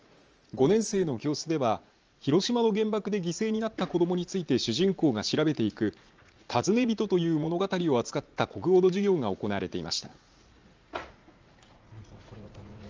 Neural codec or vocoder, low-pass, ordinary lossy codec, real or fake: vocoder, 44.1 kHz, 80 mel bands, Vocos; 7.2 kHz; Opus, 24 kbps; fake